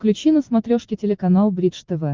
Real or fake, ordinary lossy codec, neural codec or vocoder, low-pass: real; Opus, 32 kbps; none; 7.2 kHz